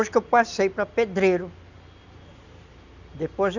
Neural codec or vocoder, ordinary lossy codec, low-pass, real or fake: none; none; 7.2 kHz; real